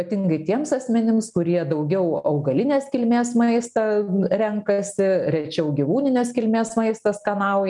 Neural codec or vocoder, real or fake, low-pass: none; real; 10.8 kHz